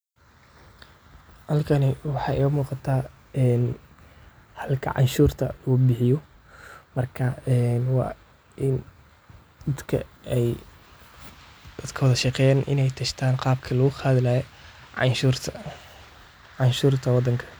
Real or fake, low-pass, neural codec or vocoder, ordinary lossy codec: real; none; none; none